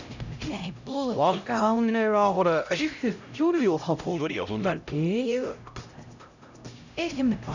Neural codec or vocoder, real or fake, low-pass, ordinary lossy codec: codec, 16 kHz, 0.5 kbps, X-Codec, HuBERT features, trained on LibriSpeech; fake; 7.2 kHz; none